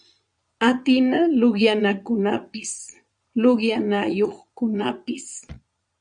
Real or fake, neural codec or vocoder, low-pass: fake; vocoder, 22.05 kHz, 80 mel bands, Vocos; 9.9 kHz